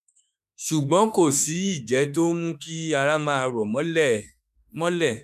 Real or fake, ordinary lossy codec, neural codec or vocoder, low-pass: fake; none; autoencoder, 48 kHz, 32 numbers a frame, DAC-VAE, trained on Japanese speech; 14.4 kHz